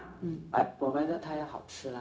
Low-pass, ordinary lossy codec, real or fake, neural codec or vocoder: none; none; fake; codec, 16 kHz, 0.4 kbps, LongCat-Audio-Codec